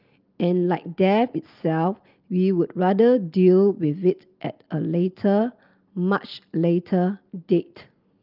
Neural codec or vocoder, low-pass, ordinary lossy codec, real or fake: none; 5.4 kHz; Opus, 24 kbps; real